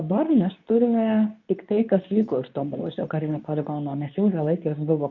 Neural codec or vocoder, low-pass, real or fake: codec, 24 kHz, 0.9 kbps, WavTokenizer, medium speech release version 2; 7.2 kHz; fake